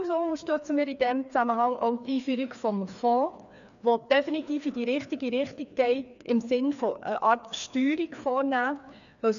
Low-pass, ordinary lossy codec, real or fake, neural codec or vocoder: 7.2 kHz; none; fake; codec, 16 kHz, 2 kbps, FreqCodec, larger model